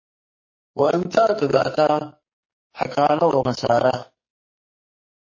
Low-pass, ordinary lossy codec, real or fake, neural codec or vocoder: 7.2 kHz; MP3, 32 kbps; fake; codec, 44.1 kHz, 2.6 kbps, SNAC